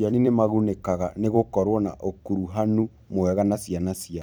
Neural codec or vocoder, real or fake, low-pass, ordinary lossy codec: vocoder, 44.1 kHz, 128 mel bands every 256 samples, BigVGAN v2; fake; none; none